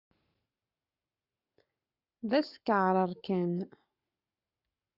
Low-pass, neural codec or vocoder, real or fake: 5.4 kHz; codec, 44.1 kHz, 7.8 kbps, Pupu-Codec; fake